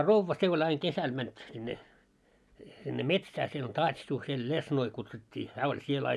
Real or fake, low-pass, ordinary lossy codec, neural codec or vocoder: real; none; none; none